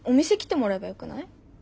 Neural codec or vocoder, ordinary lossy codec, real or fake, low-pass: none; none; real; none